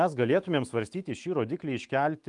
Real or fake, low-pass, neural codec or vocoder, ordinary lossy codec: fake; 10.8 kHz; autoencoder, 48 kHz, 128 numbers a frame, DAC-VAE, trained on Japanese speech; Opus, 32 kbps